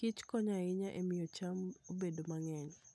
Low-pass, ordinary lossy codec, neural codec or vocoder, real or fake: none; none; none; real